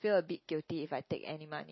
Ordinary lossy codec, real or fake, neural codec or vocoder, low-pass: MP3, 24 kbps; real; none; 7.2 kHz